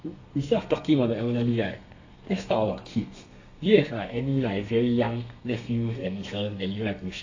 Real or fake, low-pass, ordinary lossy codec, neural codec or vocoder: fake; 7.2 kHz; AAC, 48 kbps; codec, 32 kHz, 1.9 kbps, SNAC